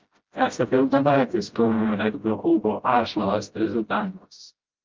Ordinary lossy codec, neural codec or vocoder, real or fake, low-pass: Opus, 24 kbps; codec, 16 kHz, 0.5 kbps, FreqCodec, smaller model; fake; 7.2 kHz